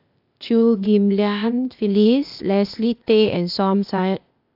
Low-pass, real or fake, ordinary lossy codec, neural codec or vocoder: 5.4 kHz; fake; AAC, 48 kbps; codec, 16 kHz, 0.8 kbps, ZipCodec